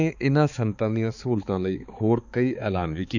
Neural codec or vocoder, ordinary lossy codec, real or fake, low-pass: codec, 16 kHz, 4 kbps, X-Codec, HuBERT features, trained on balanced general audio; none; fake; 7.2 kHz